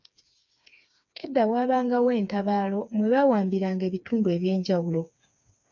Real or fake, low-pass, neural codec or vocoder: fake; 7.2 kHz; codec, 16 kHz, 4 kbps, FreqCodec, smaller model